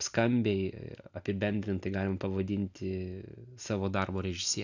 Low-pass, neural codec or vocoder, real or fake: 7.2 kHz; none; real